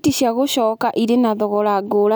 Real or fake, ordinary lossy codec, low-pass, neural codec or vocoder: real; none; none; none